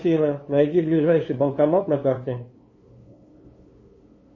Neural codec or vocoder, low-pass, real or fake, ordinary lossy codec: codec, 16 kHz, 2 kbps, FunCodec, trained on LibriTTS, 25 frames a second; 7.2 kHz; fake; MP3, 32 kbps